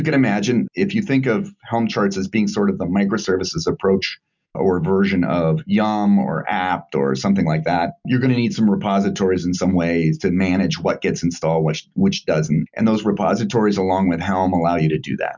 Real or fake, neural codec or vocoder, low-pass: real; none; 7.2 kHz